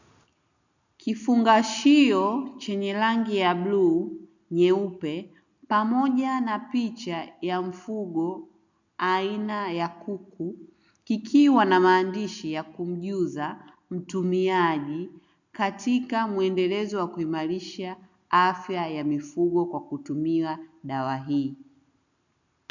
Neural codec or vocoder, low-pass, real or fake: none; 7.2 kHz; real